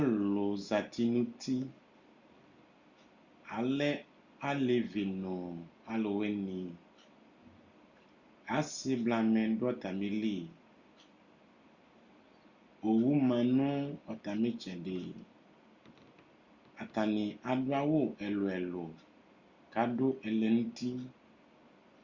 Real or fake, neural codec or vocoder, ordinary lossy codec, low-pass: real; none; Opus, 64 kbps; 7.2 kHz